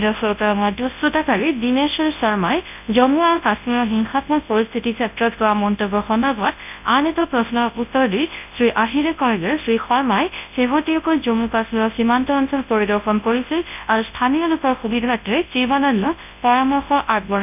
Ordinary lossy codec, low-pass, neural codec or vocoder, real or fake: none; 3.6 kHz; codec, 24 kHz, 0.9 kbps, WavTokenizer, large speech release; fake